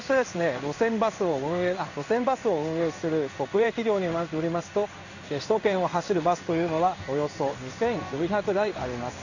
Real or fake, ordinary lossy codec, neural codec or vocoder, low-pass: fake; none; codec, 16 kHz in and 24 kHz out, 1 kbps, XY-Tokenizer; 7.2 kHz